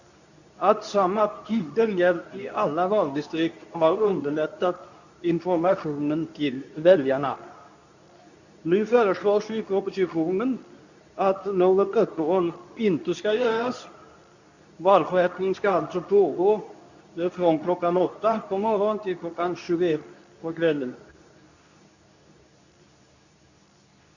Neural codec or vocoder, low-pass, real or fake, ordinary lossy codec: codec, 24 kHz, 0.9 kbps, WavTokenizer, medium speech release version 2; 7.2 kHz; fake; none